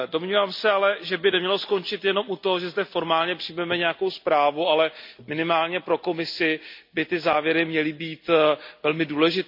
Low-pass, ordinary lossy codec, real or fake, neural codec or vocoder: 5.4 kHz; MP3, 48 kbps; real; none